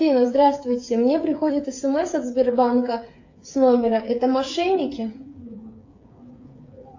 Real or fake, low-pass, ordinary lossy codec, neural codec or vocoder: fake; 7.2 kHz; AAC, 48 kbps; codec, 16 kHz, 8 kbps, FreqCodec, smaller model